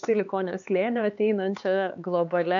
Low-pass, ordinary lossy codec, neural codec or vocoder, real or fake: 7.2 kHz; AAC, 64 kbps; codec, 16 kHz, 4 kbps, X-Codec, HuBERT features, trained on LibriSpeech; fake